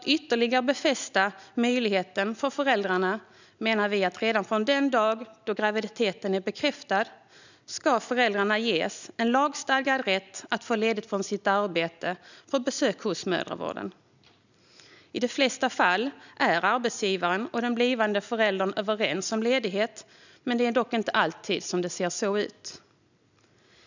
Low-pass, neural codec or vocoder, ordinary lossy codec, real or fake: 7.2 kHz; none; none; real